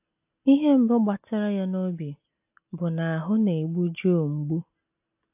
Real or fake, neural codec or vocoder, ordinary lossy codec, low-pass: real; none; AAC, 32 kbps; 3.6 kHz